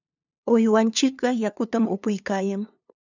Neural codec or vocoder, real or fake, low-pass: codec, 16 kHz, 2 kbps, FunCodec, trained on LibriTTS, 25 frames a second; fake; 7.2 kHz